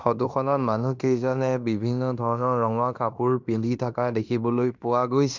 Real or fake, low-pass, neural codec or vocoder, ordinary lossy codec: fake; 7.2 kHz; codec, 16 kHz in and 24 kHz out, 0.9 kbps, LongCat-Audio-Codec, fine tuned four codebook decoder; none